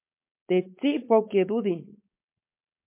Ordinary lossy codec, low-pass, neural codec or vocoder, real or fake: MP3, 24 kbps; 3.6 kHz; codec, 16 kHz, 4.8 kbps, FACodec; fake